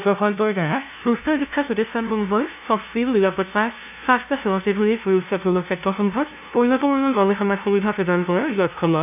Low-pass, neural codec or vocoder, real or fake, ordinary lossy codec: 3.6 kHz; codec, 16 kHz, 0.5 kbps, FunCodec, trained on LibriTTS, 25 frames a second; fake; none